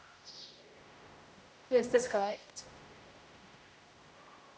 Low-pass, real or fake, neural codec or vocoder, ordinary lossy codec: none; fake; codec, 16 kHz, 0.5 kbps, X-Codec, HuBERT features, trained on general audio; none